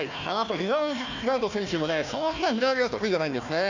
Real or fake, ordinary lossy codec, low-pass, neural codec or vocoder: fake; none; 7.2 kHz; codec, 16 kHz, 1 kbps, FunCodec, trained on Chinese and English, 50 frames a second